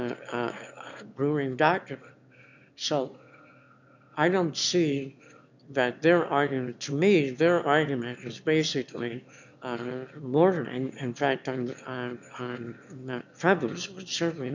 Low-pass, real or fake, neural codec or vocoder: 7.2 kHz; fake; autoencoder, 22.05 kHz, a latent of 192 numbers a frame, VITS, trained on one speaker